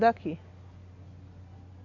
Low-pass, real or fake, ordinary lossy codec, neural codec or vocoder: 7.2 kHz; real; none; none